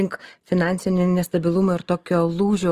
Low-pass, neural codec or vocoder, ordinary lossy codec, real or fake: 14.4 kHz; none; Opus, 24 kbps; real